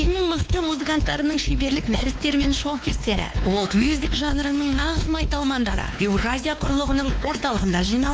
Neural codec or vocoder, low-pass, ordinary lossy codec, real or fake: codec, 16 kHz, 2 kbps, X-Codec, WavLM features, trained on Multilingual LibriSpeech; none; none; fake